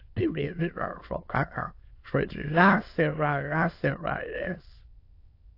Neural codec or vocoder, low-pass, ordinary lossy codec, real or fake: autoencoder, 22.05 kHz, a latent of 192 numbers a frame, VITS, trained on many speakers; 5.4 kHz; AAC, 32 kbps; fake